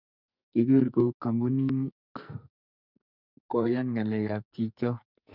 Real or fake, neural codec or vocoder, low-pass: fake; codec, 44.1 kHz, 2.6 kbps, SNAC; 5.4 kHz